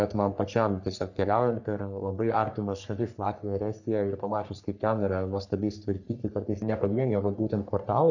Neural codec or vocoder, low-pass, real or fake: codec, 44.1 kHz, 3.4 kbps, Pupu-Codec; 7.2 kHz; fake